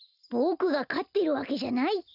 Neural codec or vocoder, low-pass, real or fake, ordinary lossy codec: none; 5.4 kHz; real; none